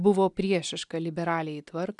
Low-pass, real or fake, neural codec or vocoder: 10.8 kHz; real; none